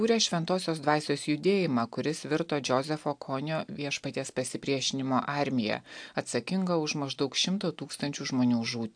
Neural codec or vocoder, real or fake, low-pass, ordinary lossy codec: none; real; 9.9 kHz; AAC, 64 kbps